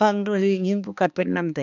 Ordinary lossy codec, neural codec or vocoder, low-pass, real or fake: none; codec, 16 kHz, 2 kbps, X-Codec, HuBERT features, trained on balanced general audio; 7.2 kHz; fake